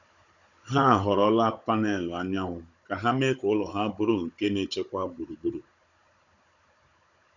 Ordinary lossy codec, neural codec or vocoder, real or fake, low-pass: none; codec, 16 kHz, 16 kbps, FunCodec, trained on Chinese and English, 50 frames a second; fake; 7.2 kHz